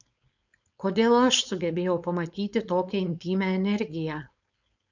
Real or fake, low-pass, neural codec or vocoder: fake; 7.2 kHz; codec, 16 kHz, 4.8 kbps, FACodec